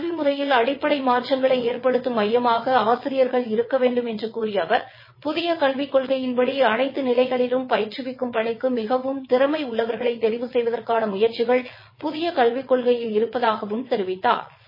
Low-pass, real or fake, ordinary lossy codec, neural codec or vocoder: 5.4 kHz; fake; MP3, 24 kbps; vocoder, 22.05 kHz, 80 mel bands, WaveNeXt